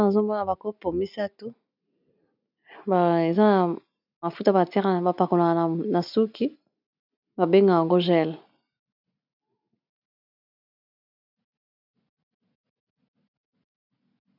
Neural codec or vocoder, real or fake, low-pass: none; real; 5.4 kHz